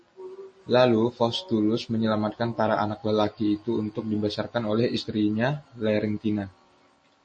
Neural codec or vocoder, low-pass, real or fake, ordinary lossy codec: none; 9.9 kHz; real; MP3, 32 kbps